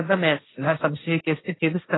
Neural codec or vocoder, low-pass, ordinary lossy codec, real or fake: codec, 16 kHz, 1.1 kbps, Voila-Tokenizer; 7.2 kHz; AAC, 16 kbps; fake